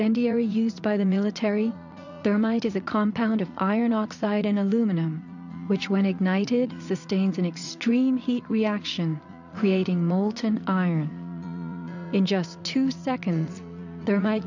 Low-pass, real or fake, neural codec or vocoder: 7.2 kHz; fake; vocoder, 44.1 kHz, 80 mel bands, Vocos